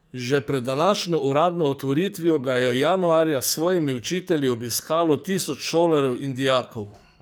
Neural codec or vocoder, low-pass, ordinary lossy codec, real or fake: codec, 44.1 kHz, 2.6 kbps, SNAC; none; none; fake